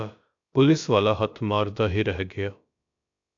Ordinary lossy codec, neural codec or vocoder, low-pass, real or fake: AAC, 64 kbps; codec, 16 kHz, about 1 kbps, DyCAST, with the encoder's durations; 7.2 kHz; fake